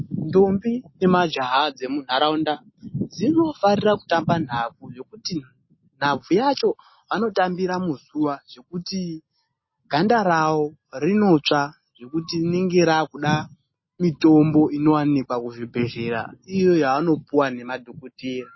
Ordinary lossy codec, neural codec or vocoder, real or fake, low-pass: MP3, 24 kbps; none; real; 7.2 kHz